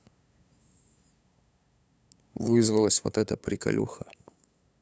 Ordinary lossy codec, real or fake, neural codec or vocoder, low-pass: none; fake; codec, 16 kHz, 8 kbps, FunCodec, trained on LibriTTS, 25 frames a second; none